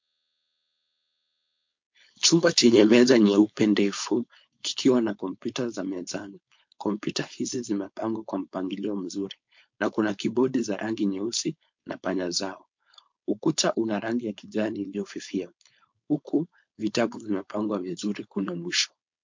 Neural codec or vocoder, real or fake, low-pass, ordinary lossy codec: codec, 16 kHz, 4.8 kbps, FACodec; fake; 7.2 kHz; MP3, 48 kbps